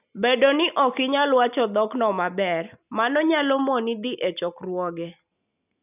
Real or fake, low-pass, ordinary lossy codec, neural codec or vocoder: real; 3.6 kHz; none; none